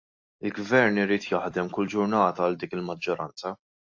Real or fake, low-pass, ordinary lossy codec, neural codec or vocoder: real; 7.2 kHz; AAC, 48 kbps; none